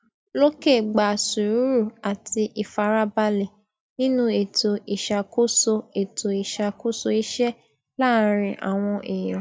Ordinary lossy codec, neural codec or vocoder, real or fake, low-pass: none; none; real; none